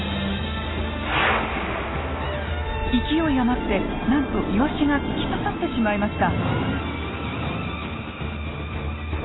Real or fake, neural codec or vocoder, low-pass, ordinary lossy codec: real; none; 7.2 kHz; AAC, 16 kbps